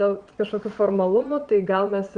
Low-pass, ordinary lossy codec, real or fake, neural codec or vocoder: 9.9 kHz; Opus, 64 kbps; fake; vocoder, 22.05 kHz, 80 mel bands, Vocos